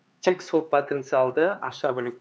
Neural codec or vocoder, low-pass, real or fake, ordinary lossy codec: codec, 16 kHz, 4 kbps, X-Codec, HuBERT features, trained on LibriSpeech; none; fake; none